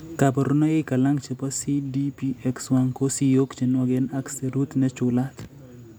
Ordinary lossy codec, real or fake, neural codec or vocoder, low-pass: none; real; none; none